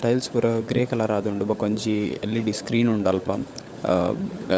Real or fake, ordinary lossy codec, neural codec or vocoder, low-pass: fake; none; codec, 16 kHz, 16 kbps, FunCodec, trained on LibriTTS, 50 frames a second; none